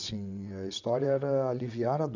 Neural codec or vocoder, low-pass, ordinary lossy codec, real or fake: vocoder, 22.05 kHz, 80 mel bands, WaveNeXt; 7.2 kHz; none; fake